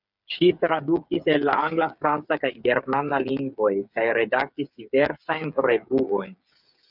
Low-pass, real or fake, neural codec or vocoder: 5.4 kHz; fake; codec, 16 kHz, 8 kbps, FreqCodec, smaller model